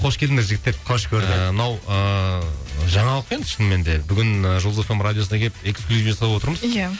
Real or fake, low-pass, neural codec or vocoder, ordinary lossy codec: real; none; none; none